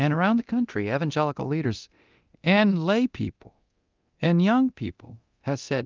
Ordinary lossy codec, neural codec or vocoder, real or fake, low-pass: Opus, 24 kbps; codec, 24 kHz, 0.9 kbps, DualCodec; fake; 7.2 kHz